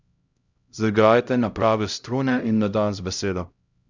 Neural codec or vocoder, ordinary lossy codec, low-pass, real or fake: codec, 16 kHz, 0.5 kbps, X-Codec, HuBERT features, trained on LibriSpeech; Opus, 64 kbps; 7.2 kHz; fake